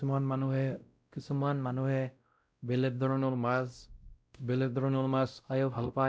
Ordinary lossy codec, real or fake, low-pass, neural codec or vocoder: none; fake; none; codec, 16 kHz, 0.5 kbps, X-Codec, WavLM features, trained on Multilingual LibriSpeech